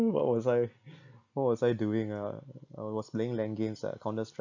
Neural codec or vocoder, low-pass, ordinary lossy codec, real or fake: none; 7.2 kHz; none; real